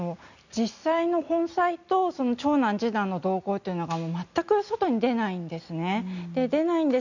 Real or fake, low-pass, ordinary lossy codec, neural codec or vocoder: real; 7.2 kHz; none; none